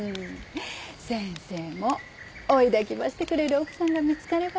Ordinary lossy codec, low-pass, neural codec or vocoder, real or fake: none; none; none; real